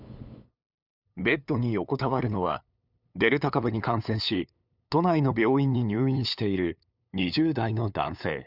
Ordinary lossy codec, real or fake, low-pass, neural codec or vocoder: none; fake; 5.4 kHz; codec, 16 kHz, 8 kbps, FunCodec, trained on LibriTTS, 25 frames a second